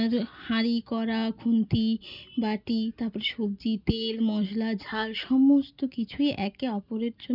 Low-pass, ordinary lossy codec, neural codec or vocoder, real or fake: 5.4 kHz; none; none; real